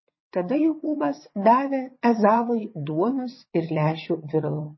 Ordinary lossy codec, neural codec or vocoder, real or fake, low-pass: MP3, 24 kbps; vocoder, 22.05 kHz, 80 mel bands, WaveNeXt; fake; 7.2 kHz